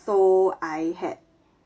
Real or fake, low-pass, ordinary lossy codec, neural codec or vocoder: real; none; none; none